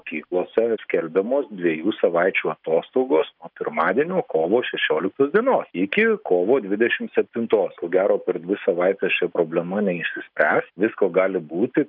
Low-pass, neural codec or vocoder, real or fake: 5.4 kHz; none; real